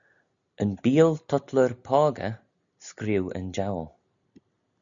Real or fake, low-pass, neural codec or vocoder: real; 7.2 kHz; none